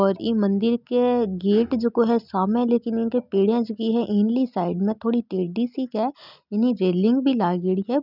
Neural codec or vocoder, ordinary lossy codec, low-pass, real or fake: none; none; 5.4 kHz; real